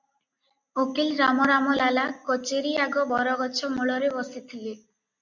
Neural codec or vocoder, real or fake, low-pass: none; real; 7.2 kHz